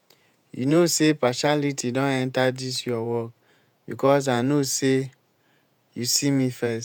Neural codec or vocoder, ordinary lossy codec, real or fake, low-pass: vocoder, 48 kHz, 128 mel bands, Vocos; none; fake; none